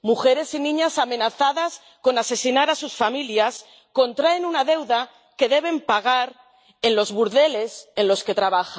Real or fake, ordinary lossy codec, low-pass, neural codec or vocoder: real; none; none; none